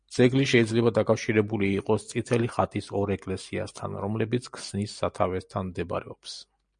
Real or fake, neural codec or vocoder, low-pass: real; none; 9.9 kHz